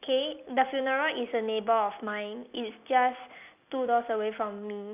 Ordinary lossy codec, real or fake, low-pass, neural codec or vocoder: none; real; 3.6 kHz; none